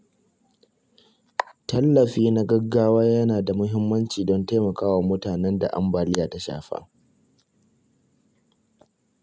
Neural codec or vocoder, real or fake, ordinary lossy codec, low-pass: none; real; none; none